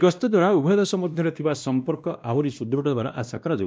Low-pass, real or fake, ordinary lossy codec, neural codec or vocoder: none; fake; none; codec, 16 kHz, 1 kbps, X-Codec, WavLM features, trained on Multilingual LibriSpeech